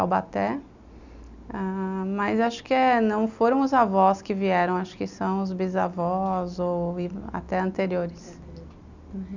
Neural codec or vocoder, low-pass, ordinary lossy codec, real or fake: none; 7.2 kHz; none; real